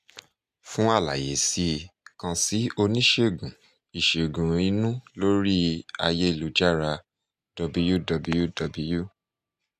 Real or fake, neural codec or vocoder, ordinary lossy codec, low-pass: real; none; none; 14.4 kHz